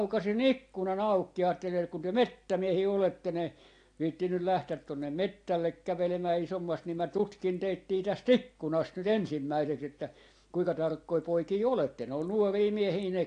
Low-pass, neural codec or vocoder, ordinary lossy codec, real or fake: 9.9 kHz; none; AAC, 48 kbps; real